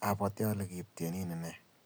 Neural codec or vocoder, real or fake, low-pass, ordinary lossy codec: none; real; none; none